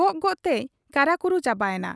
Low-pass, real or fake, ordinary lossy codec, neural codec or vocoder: none; real; none; none